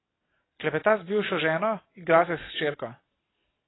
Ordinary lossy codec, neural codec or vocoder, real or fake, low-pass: AAC, 16 kbps; none; real; 7.2 kHz